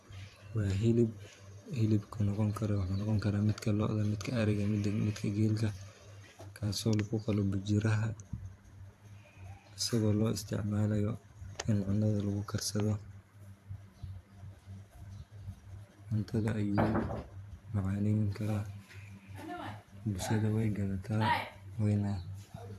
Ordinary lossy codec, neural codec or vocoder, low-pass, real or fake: AAC, 64 kbps; vocoder, 44.1 kHz, 128 mel bands every 512 samples, BigVGAN v2; 14.4 kHz; fake